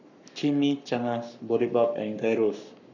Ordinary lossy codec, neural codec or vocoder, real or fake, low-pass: none; codec, 44.1 kHz, 7.8 kbps, Pupu-Codec; fake; 7.2 kHz